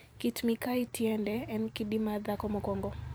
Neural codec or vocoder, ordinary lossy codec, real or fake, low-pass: vocoder, 44.1 kHz, 128 mel bands every 512 samples, BigVGAN v2; none; fake; none